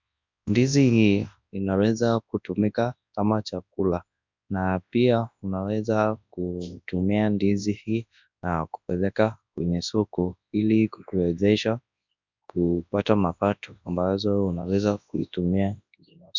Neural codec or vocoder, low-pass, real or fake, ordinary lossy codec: codec, 24 kHz, 0.9 kbps, WavTokenizer, large speech release; 7.2 kHz; fake; MP3, 64 kbps